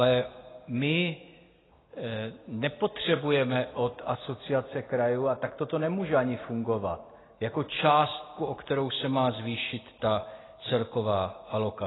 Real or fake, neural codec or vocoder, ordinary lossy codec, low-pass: real; none; AAC, 16 kbps; 7.2 kHz